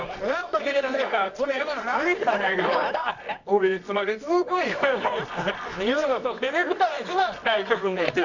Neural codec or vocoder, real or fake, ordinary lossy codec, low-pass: codec, 24 kHz, 0.9 kbps, WavTokenizer, medium music audio release; fake; none; 7.2 kHz